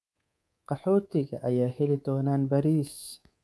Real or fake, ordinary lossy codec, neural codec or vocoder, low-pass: fake; none; codec, 24 kHz, 3.1 kbps, DualCodec; none